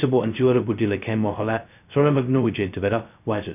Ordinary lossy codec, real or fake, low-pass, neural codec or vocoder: none; fake; 3.6 kHz; codec, 16 kHz, 0.2 kbps, FocalCodec